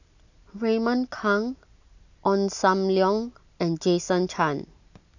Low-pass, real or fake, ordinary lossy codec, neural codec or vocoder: 7.2 kHz; real; none; none